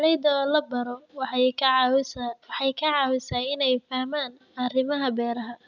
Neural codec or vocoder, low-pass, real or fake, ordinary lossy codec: none; 7.2 kHz; real; none